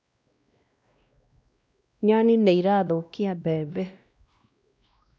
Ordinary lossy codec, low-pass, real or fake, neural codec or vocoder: none; none; fake; codec, 16 kHz, 1 kbps, X-Codec, WavLM features, trained on Multilingual LibriSpeech